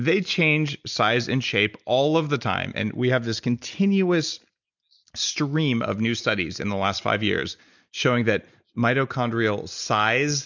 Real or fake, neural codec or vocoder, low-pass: real; none; 7.2 kHz